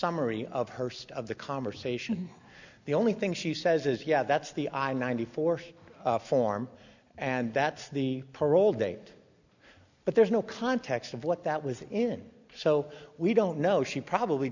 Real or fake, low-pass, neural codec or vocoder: real; 7.2 kHz; none